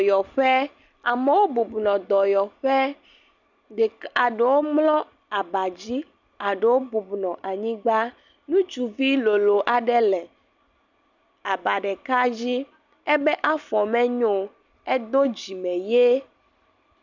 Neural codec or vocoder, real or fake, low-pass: none; real; 7.2 kHz